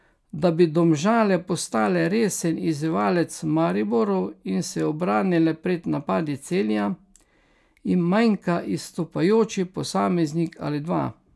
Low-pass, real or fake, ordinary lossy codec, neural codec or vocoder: none; real; none; none